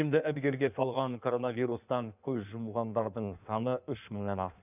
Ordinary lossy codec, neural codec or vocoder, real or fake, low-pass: none; codec, 16 kHz in and 24 kHz out, 1.1 kbps, FireRedTTS-2 codec; fake; 3.6 kHz